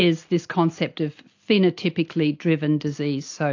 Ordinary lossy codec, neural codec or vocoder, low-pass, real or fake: AAC, 48 kbps; none; 7.2 kHz; real